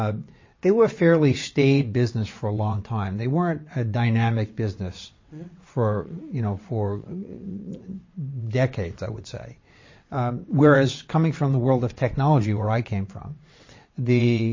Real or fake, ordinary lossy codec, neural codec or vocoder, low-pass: fake; MP3, 32 kbps; vocoder, 22.05 kHz, 80 mel bands, WaveNeXt; 7.2 kHz